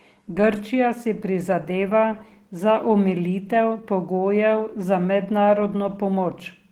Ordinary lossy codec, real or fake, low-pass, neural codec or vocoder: Opus, 16 kbps; real; 19.8 kHz; none